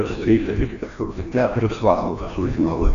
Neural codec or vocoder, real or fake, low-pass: codec, 16 kHz, 1 kbps, FreqCodec, larger model; fake; 7.2 kHz